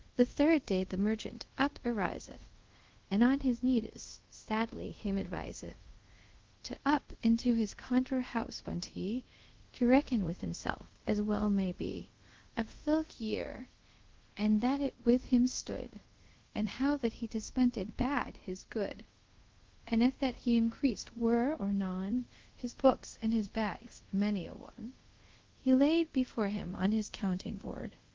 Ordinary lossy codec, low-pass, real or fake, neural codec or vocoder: Opus, 16 kbps; 7.2 kHz; fake; codec, 24 kHz, 0.5 kbps, DualCodec